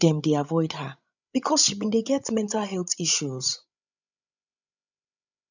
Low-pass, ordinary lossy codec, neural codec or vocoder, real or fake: 7.2 kHz; none; codec, 16 kHz, 16 kbps, FreqCodec, larger model; fake